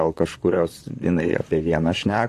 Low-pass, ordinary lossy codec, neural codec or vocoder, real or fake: 14.4 kHz; AAC, 48 kbps; vocoder, 44.1 kHz, 128 mel bands, Pupu-Vocoder; fake